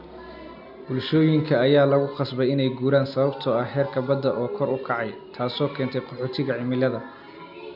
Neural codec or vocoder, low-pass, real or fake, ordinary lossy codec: none; 5.4 kHz; real; none